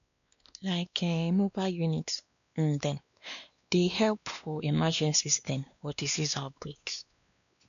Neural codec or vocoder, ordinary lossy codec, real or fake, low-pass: codec, 16 kHz, 2 kbps, X-Codec, WavLM features, trained on Multilingual LibriSpeech; none; fake; 7.2 kHz